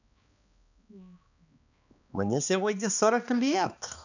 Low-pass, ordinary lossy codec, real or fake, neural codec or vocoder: 7.2 kHz; none; fake; codec, 16 kHz, 2 kbps, X-Codec, HuBERT features, trained on balanced general audio